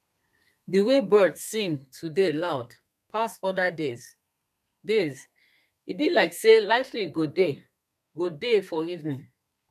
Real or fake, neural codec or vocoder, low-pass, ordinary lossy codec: fake; codec, 32 kHz, 1.9 kbps, SNAC; 14.4 kHz; none